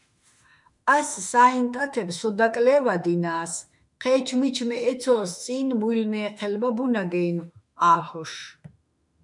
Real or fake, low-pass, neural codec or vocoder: fake; 10.8 kHz; autoencoder, 48 kHz, 32 numbers a frame, DAC-VAE, trained on Japanese speech